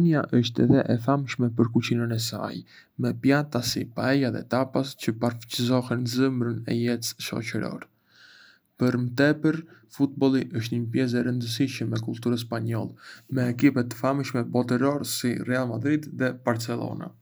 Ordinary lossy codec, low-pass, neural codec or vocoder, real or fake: none; none; none; real